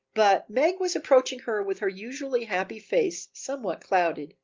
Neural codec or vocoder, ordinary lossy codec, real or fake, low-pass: none; Opus, 32 kbps; real; 7.2 kHz